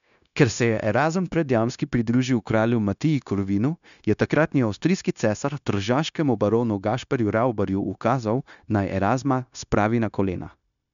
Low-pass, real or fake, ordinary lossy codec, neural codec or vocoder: 7.2 kHz; fake; none; codec, 16 kHz, 0.9 kbps, LongCat-Audio-Codec